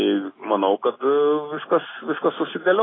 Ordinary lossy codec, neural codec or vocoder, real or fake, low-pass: AAC, 16 kbps; none; real; 7.2 kHz